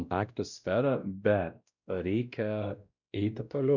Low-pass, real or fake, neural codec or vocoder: 7.2 kHz; fake; codec, 16 kHz, 1 kbps, X-Codec, WavLM features, trained on Multilingual LibriSpeech